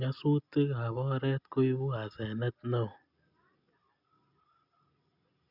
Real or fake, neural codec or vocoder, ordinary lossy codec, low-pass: real; none; none; 5.4 kHz